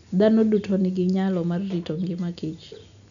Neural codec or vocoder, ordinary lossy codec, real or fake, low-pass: none; none; real; 7.2 kHz